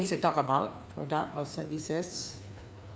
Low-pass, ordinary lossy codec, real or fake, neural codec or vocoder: none; none; fake; codec, 16 kHz, 2 kbps, FreqCodec, larger model